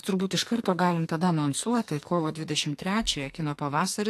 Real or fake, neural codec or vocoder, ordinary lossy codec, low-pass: fake; codec, 44.1 kHz, 2.6 kbps, SNAC; AAC, 64 kbps; 14.4 kHz